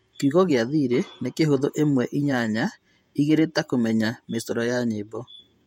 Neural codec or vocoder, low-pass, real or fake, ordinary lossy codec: vocoder, 48 kHz, 128 mel bands, Vocos; 19.8 kHz; fake; MP3, 64 kbps